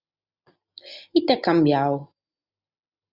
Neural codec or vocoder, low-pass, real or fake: none; 5.4 kHz; real